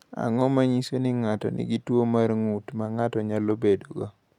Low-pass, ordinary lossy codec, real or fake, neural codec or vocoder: 19.8 kHz; none; real; none